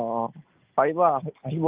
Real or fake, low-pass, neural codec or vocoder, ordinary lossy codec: real; 3.6 kHz; none; Opus, 32 kbps